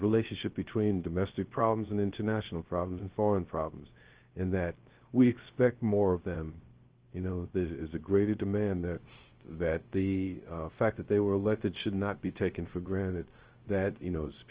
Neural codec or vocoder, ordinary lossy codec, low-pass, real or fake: codec, 16 kHz, 0.3 kbps, FocalCodec; Opus, 16 kbps; 3.6 kHz; fake